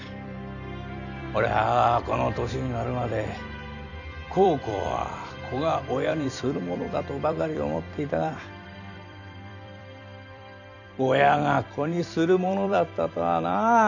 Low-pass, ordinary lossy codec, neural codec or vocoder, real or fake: 7.2 kHz; none; vocoder, 44.1 kHz, 128 mel bands every 256 samples, BigVGAN v2; fake